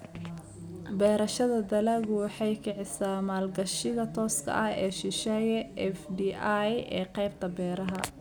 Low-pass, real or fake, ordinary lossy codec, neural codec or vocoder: none; real; none; none